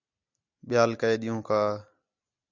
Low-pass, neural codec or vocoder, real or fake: 7.2 kHz; none; real